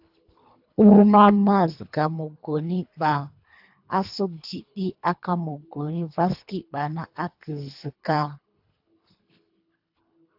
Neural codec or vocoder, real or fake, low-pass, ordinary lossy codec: codec, 24 kHz, 3 kbps, HILCodec; fake; 5.4 kHz; AAC, 48 kbps